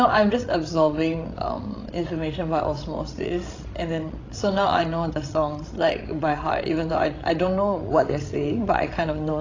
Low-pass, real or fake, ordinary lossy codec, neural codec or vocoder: 7.2 kHz; fake; AAC, 32 kbps; codec, 16 kHz, 16 kbps, FreqCodec, larger model